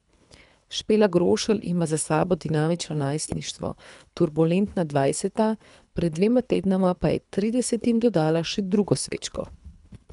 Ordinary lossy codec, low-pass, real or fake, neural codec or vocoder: none; 10.8 kHz; fake; codec, 24 kHz, 3 kbps, HILCodec